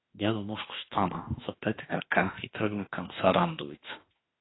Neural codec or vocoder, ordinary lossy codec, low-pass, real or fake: autoencoder, 48 kHz, 32 numbers a frame, DAC-VAE, trained on Japanese speech; AAC, 16 kbps; 7.2 kHz; fake